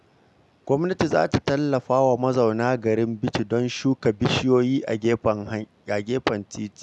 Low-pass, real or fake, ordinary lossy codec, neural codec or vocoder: none; real; none; none